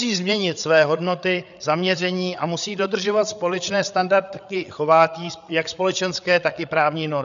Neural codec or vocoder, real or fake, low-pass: codec, 16 kHz, 8 kbps, FreqCodec, larger model; fake; 7.2 kHz